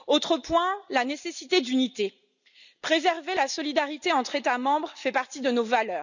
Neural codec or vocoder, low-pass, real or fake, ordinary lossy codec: none; 7.2 kHz; real; none